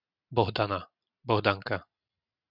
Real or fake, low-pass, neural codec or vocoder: real; 5.4 kHz; none